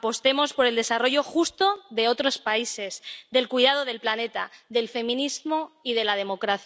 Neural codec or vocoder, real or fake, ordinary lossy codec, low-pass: none; real; none; none